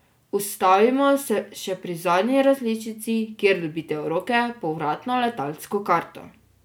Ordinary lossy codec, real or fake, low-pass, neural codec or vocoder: none; real; none; none